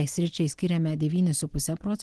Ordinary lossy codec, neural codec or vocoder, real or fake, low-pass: Opus, 16 kbps; none; real; 10.8 kHz